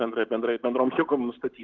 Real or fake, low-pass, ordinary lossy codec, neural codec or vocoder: real; 7.2 kHz; Opus, 16 kbps; none